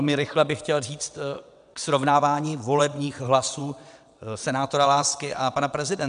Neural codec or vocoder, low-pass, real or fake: vocoder, 22.05 kHz, 80 mel bands, WaveNeXt; 9.9 kHz; fake